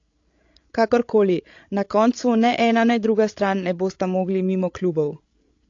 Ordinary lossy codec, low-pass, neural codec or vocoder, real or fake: AAC, 48 kbps; 7.2 kHz; codec, 16 kHz, 16 kbps, FreqCodec, larger model; fake